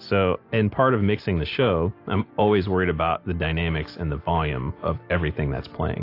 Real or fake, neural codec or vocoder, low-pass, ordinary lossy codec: real; none; 5.4 kHz; AAC, 32 kbps